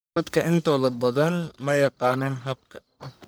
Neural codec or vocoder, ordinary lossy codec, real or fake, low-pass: codec, 44.1 kHz, 1.7 kbps, Pupu-Codec; none; fake; none